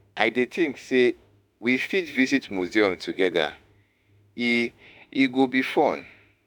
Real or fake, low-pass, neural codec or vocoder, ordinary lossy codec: fake; none; autoencoder, 48 kHz, 32 numbers a frame, DAC-VAE, trained on Japanese speech; none